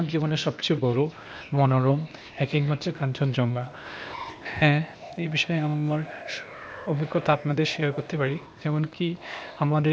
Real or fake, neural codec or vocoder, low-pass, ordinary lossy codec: fake; codec, 16 kHz, 0.8 kbps, ZipCodec; none; none